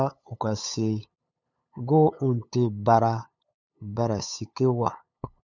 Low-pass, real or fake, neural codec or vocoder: 7.2 kHz; fake; codec, 16 kHz, 8 kbps, FunCodec, trained on LibriTTS, 25 frames a second